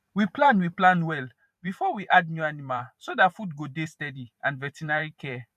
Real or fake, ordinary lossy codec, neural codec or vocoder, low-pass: fake; none; vocoder, 44.1 kHz, 128 mel bands every 512 samples, BigVGAN v2; 14.4 kHz